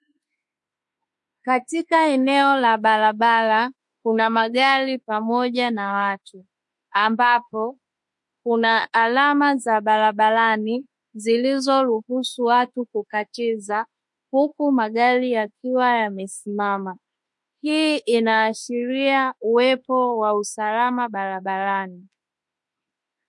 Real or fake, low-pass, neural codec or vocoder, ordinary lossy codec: fake; 10.8 kHz; autoencoder, 48 kHz, 32 numbers a frame, DAC-VAE, trained on Japanese speech; MP3, 48 kbps